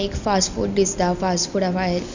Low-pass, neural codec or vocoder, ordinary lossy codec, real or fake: 7.2 kHz; none; none; real